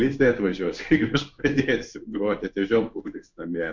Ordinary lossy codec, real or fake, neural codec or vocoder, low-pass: MP3, 64 kbps; fake; codec, 16 kHz in and 24 kHz out, 1 kbps, XY-Tokenizer; 7.2 kHz